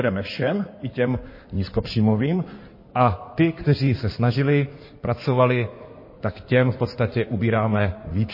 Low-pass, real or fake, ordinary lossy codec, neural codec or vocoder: 5.4 kHz; fake; MP3, 24 kbps; codec, 16 kHz in and 24 kHz out, 2.2 kbps, FireRedTTS-2 codec